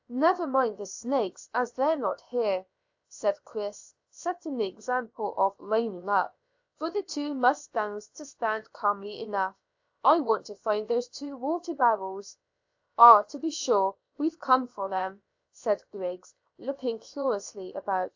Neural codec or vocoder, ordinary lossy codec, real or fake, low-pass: codec, 16 kHz, about 1 kbps, DyCAST, with the encoder's durations; AAC, 48 kbps; fake; 7.2 kHz